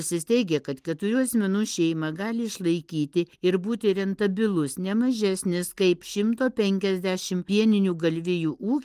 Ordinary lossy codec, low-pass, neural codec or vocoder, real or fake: Opus, 24 kbps; 14.4 kHz; none; real